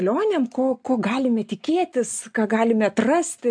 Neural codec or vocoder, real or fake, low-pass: none; real; 9.9 kHz